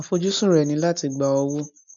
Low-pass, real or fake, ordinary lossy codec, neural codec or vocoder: 7.2 kHz; real; none; none